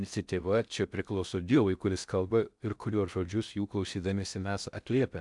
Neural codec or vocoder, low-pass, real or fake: codec, 16 kHz in and 24 kHz out, 0.6 kbps, FocalCodec, streaming, 4096 codes; 10.8 kHz; fake